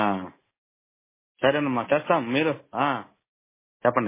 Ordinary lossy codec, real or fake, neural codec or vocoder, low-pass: MP3, 16 kbps; real; none; 3.6 kHz